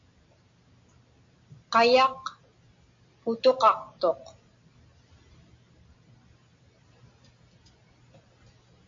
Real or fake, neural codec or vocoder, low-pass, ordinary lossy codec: real; none; 7.2 kHz; AAC, 48 kbps